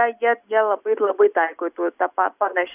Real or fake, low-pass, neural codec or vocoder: real; 3.6 kHz; none